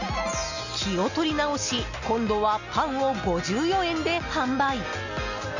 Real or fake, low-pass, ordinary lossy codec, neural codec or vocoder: real; 7.2 kHz; none; none